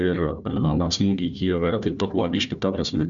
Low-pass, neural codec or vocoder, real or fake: 7.2 kHz; codec, 16 kHz, 1 kbps, FunCodec, trained on Chinese and English, 50 frames a second; fake